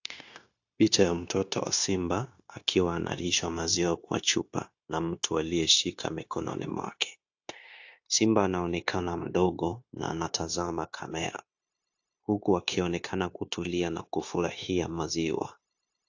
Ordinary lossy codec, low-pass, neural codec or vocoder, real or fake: AAC, 48 kbps; 7.2 kHz; codec, 16 kHz, 0.9 kbps, LongCat-Audio-Codec; fake